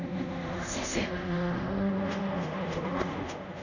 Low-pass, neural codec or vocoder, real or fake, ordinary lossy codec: 7.2 kHz; codec, 24 kHz, 0.5 kbps, DualCodec; fake; none